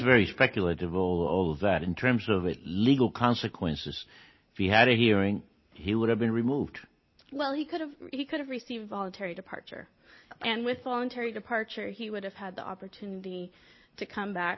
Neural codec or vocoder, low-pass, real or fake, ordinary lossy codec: none; 7.2 kHz; real; MP3, 24 kbps